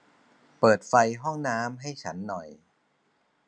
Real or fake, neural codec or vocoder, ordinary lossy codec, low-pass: real; none; none; 9.9 kHz